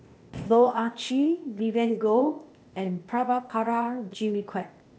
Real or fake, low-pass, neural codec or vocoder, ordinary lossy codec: fake; none; codec, 16 kHz, 0.8 kbps, ZipCodec; none